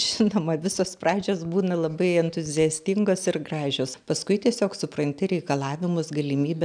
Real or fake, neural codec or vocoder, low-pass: real; none; 9.9 kHz